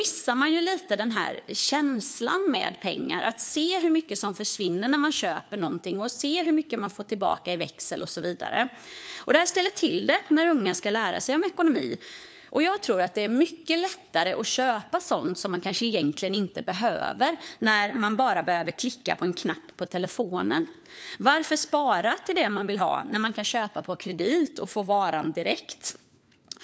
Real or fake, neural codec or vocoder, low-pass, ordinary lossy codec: fake; codec, 16 kHz, 4 kbps, FunCodec, trained on LibriTTS, 50 frames a second; none; none